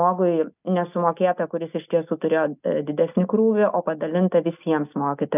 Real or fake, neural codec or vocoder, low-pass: real; none; 3.6 kHz